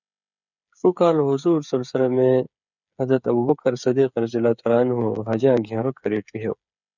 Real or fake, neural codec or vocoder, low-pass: fake; codec, 16 kHz, 8 kbps, FreqCodec, smaller model; 7.2 kHz